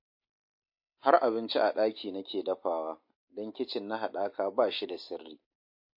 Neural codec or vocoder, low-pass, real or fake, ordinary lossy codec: none; 5.4 kHz; real; MP3, 32 kbps